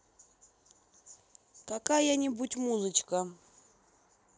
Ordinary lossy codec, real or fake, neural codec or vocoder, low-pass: none; real; none; none